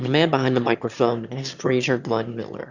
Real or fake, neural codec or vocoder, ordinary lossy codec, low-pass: fake; autoencoder, 22.05 kHz, a latent of 192 numbers a frame, VITS, trained on one speaker; Opus, 64 kbps; 7.2 kHz